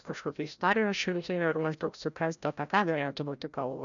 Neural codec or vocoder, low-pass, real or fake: codec, 16 kHz, 0.5 kbps, FreqCodec, larger model; 7.2 kHz; fake